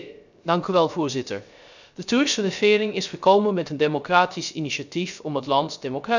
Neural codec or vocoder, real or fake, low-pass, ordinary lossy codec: codec, 16 kHz, 0.3 kbps, FocalCodec; fake; 7.2 kHz; none